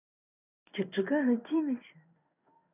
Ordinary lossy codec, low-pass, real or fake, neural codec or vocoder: none; 3.6 kHz; fake; codec, 16 kHz in and 24 kHz out, 1 kbps, XY-Tokenizer